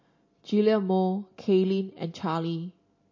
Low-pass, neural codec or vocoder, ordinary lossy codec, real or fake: 7.2 kHz; none; MP3, 32 kbps; real